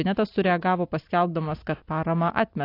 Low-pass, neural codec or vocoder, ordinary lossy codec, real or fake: 5.4 kHz; none; AAC, 32 kbps; real